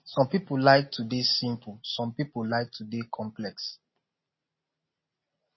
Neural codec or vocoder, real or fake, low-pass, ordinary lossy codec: none; real; 7.2 kHz; MP3, 24 kbps